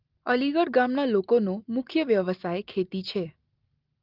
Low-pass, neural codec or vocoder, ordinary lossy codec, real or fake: 5.4 kHz; none; Opus, 32 kbps; real